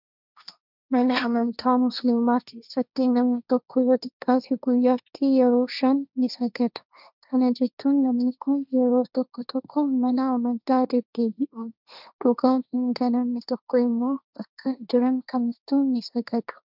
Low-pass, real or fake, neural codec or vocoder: 5.4 kHz; fake; codec, 16 kHz, 1.1 kbps, Voila-Tokenizer